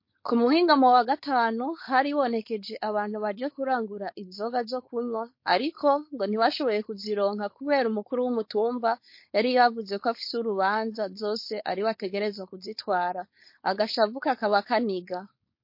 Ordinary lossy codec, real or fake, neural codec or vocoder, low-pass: MP3, 32 kbps; fake; codec, 16 kHz, 4.8 kbps, FACodec; 5.4 kHz